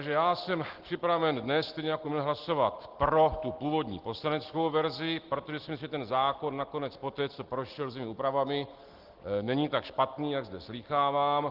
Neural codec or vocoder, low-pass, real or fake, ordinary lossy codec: none; 5.4 kHz; real; Opus, 16 kbps